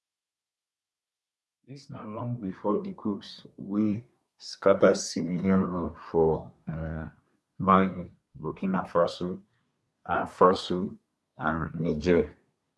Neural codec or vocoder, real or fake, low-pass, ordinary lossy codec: codec, 24 kHz, 1 kbps, SNAC; fake; none; none